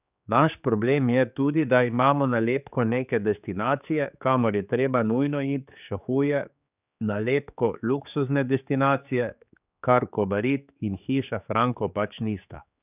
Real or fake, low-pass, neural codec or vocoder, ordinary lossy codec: fake; 3.6 kHz; codec, 16 kHz, 4 kbps, X-Codec, HuBERT features, trained on general audio; none